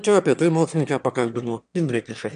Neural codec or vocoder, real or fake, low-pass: autoencoder, 22.05 kHz, a latent of 192 numbers a frame, VITS, trained on one speaker; fake; 9.9 kHz